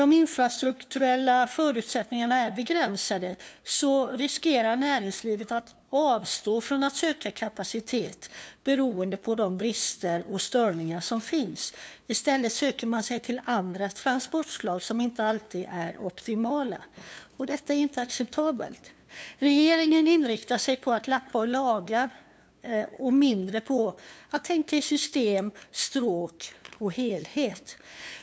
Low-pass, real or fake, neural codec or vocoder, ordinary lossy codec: none; fake; codec, 16 kHz, 2 kbps, FunCodec, trained on LibriTTS, 25 frames a second; none